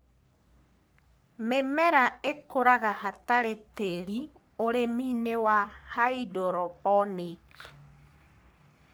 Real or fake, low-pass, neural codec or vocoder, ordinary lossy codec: fake; none; codec, 44.1 kHz, 3.4 kbps, Pupu-Codec; none